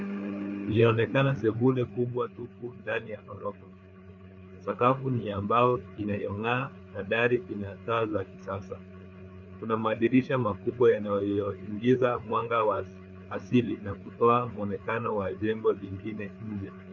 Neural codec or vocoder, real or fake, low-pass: codec, 16 kHz, 4 kbps, FreqCodec, larger model; fake; 7.2 kHz